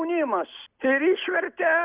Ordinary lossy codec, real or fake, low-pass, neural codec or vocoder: Opus, 64 kbps; real; 3.6 kHz; none